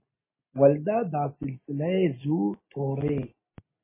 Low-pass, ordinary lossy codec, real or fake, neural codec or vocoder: 3.6 kHz; MP3, 16 kbps; real; none